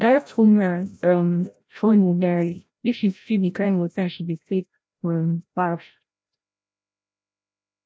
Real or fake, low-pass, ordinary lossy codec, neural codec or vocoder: fake; none; none; codec, 16 kHz, 0.5 kbps, FreqCodec, larger model